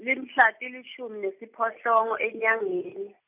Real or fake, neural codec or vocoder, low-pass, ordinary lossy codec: real; none; 3.6 kHz; none